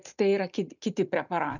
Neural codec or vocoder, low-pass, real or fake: none; 7.2 kHz; real